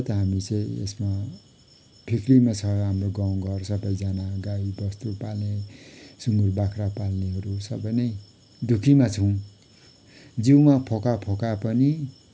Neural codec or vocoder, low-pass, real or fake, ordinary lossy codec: none; none; real; none